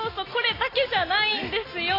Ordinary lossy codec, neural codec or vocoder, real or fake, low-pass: AAC, 24 kbps; none; real; 5.4 kHz